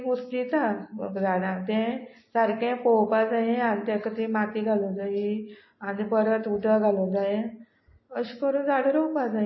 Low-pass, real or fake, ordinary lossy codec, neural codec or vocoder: 7.2 kHz; real; MP3, 24 kbps; none